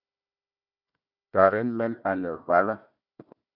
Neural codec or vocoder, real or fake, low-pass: codec, 16 kHz, 1 kbps, FunCodec, trained on Chinese and English, 50 frames a second; fake; 5.4 kHz